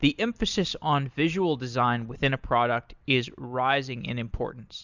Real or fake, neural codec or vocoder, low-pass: real; none; 7.2 kHz